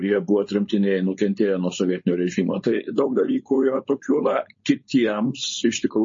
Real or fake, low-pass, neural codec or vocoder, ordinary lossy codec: fake; 7.2 kHz; codec, 16 kHz, 4.8 kbps, FACodec; MP3, 32 kbps